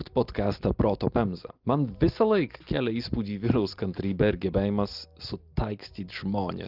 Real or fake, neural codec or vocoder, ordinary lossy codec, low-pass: real; none; Opus, 32 kbps; 5.4 kHz